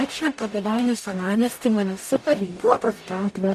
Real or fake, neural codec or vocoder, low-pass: fake; codec, 44.1 kHz, 0.9 kbps, DAC; 14.4 kHz